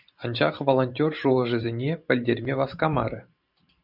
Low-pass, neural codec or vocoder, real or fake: 5.4 kHz; vocoder, 44.1 kHz, 128 mel bands every 512 samples, BigVGAN v2; fake